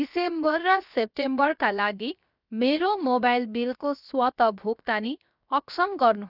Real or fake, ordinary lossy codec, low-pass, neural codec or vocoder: fake; none; 5.4 kHz; codec, 16 kHz, 0.7 kbps, FocalCodec